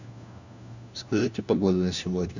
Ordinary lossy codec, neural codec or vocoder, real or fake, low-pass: none; codec, 16 kHz, 1 kbps, FunCodec, trained on LibriTTS, 50 frames a second; fake; 7.2 kHz